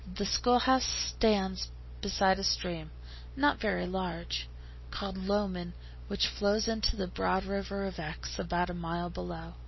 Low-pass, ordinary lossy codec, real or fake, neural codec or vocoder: 7.2 kHz; MP3, 24 kbps; real; none